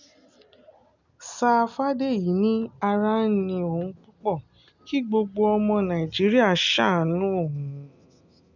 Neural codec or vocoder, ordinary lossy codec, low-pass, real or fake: none; none; 7.2 kHz; real